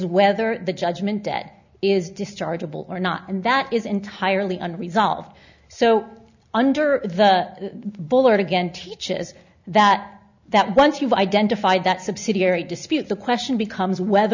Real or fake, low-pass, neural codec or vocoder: real; 7.2 kHz; none